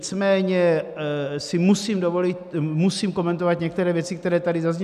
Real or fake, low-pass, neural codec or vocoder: real; 14.4 kHz; none